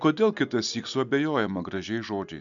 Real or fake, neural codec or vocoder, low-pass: real; none; 7.2 kHz